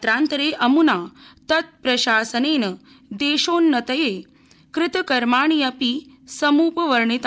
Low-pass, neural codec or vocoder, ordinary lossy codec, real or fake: none; none; none; real